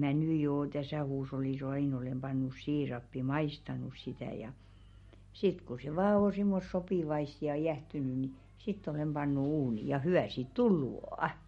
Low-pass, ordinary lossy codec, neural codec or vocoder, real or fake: 19.8 kHz; MP3, 48 kbps; none; real